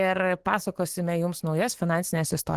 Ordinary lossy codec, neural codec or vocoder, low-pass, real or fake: Opus, 16 kbps; autoencoder, 48 kHz, 128 numbers a frame, DAC-VAE, trained on Japanese speech; 14.4 kHz; fake